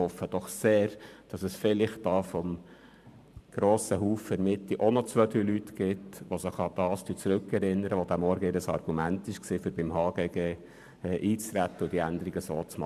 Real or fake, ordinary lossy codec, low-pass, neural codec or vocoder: fake; none; 14.4 kHz; vocoder, 44.1 kHz, 128 mel bands every 512 samples, BigVGAN v2